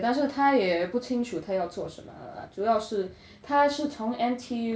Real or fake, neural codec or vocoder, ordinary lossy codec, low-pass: real; none; none; none